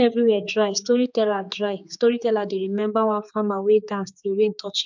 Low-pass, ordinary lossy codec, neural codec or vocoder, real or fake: 7.2 kHz; MP3, 64 kbps; codec, 16 kHz, 4 kbps, X-Codec, HuBERT features, trained on general audio; fake